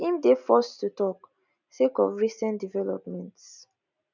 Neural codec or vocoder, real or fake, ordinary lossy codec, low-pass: none; real; none; 7.2 kHz